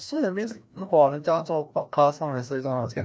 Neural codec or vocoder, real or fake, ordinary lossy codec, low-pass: codec, 16 kHz, 1 kbps, FreqCodec, larger model; fake; none; none